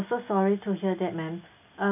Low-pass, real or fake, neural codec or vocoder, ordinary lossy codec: 3.6 kHz; real; none; none